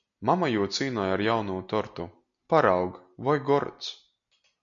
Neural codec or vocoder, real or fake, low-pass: none; real; 7.2 kHz